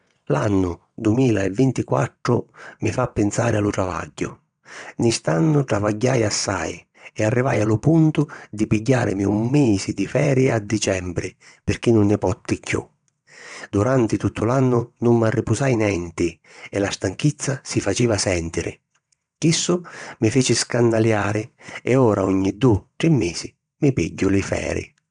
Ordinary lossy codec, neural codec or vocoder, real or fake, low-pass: none; vocoder, 22.05 kHz, 80 mel bands, WaveNeXt; fake; 9.9 kHz